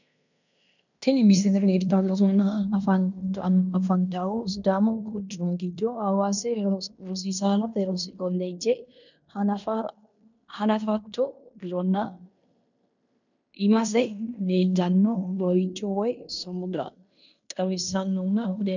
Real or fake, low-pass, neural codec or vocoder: fake; 7.2 kHz; codec, 16 kHz in and 24 kHz out, 0.9 kbps, LongCat-Audio-Codec, fine tuned four codebook decoder